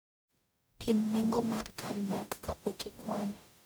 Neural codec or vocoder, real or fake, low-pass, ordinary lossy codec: codec, 44.1 kHz, 0.9 kbps, DAC; fake; none; none